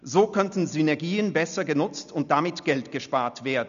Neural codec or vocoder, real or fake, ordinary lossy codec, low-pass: none; real; none; 7.2 kHz